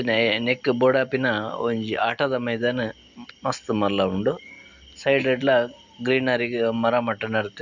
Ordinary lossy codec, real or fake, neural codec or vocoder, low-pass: none; real; none; 7.2 kHz